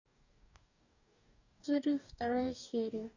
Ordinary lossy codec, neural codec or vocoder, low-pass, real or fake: none; codec, 44.1 kHz, 2.6 kbps, DAC; 7.2 kHz; fake